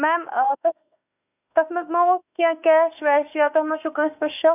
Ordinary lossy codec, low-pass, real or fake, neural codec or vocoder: none; 3.6 kHz; fake; autoencoder, 48 kHz, 32 numbers a frame, DAC-VAE, trained on Japanese speech